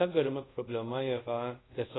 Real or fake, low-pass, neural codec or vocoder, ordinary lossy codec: fake; 7.2 kHz; codec, 24 kHz, 0.5 kbps, DualCodec; AAC, 16 kbps